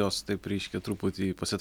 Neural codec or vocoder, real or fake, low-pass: none; real; 19.8 kHz